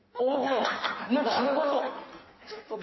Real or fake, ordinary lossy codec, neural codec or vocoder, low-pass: fake; MP3, 24 kbps; codec, 16 kHz in and 24 kHz out, 1.1 kbps, FireRedTTS-2 codec; 7.2 kHz